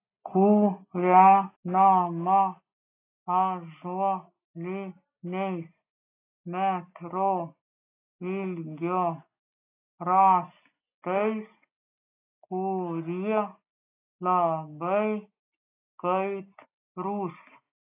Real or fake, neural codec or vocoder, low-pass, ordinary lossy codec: real; none; 3.6 kHz; AAC, 24 kbps